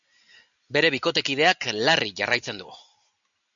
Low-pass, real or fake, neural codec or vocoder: 7.2 kHz; real; none